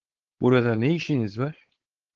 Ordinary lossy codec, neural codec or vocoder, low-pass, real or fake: Opus, 16 kbps; codec, 16 kHz, 4.8 kbps, FACodec; 7.2 kHz; fake